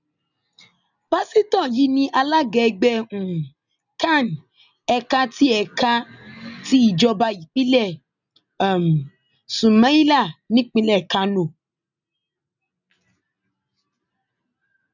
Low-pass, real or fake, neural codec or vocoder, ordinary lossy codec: 7.2 kHz; real; none; none